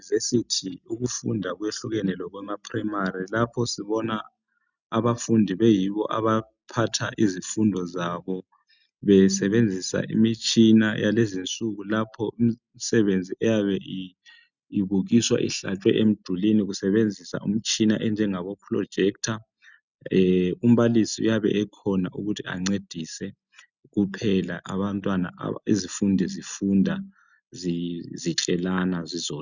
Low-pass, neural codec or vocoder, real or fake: 7.2 kHz; none; real